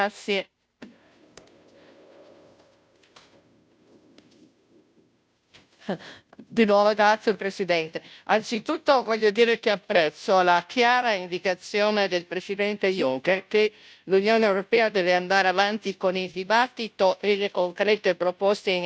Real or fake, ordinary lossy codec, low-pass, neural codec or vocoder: fake; none; none; codec, 16 kHz, 0.5 kbps, FunCodec, trained on Chinese and English, 25 frames a second